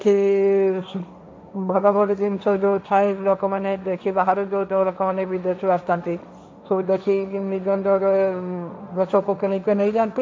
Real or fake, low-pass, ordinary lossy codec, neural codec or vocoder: fake; none; none; codec, 16 kHz, 1.1 kbps, Voila-Tokenizer